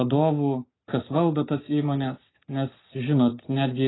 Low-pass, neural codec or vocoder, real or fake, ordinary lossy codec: 7.2 kHz; none; real; AAC, 16 kbps